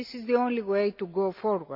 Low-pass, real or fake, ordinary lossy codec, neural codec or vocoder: 5.4 kHz; real; AAC, 48 kbps; none